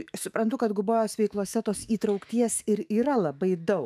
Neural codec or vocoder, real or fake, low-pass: autoencoder, 48 kHz, 128 numbers a frame, DAC-VAE, trained on Japanese speech; fake; 14.4 kHz